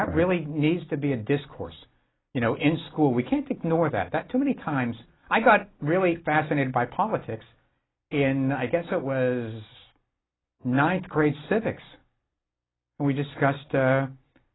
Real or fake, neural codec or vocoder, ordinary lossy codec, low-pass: real; none; AAC, 16 kbps; 7.2 kHz